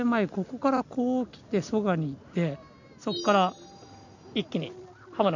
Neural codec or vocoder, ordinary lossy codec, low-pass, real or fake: none; none; 7.2 kHz; real